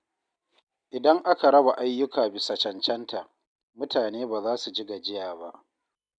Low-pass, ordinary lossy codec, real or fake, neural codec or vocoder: 9.9 kHz; none; real; none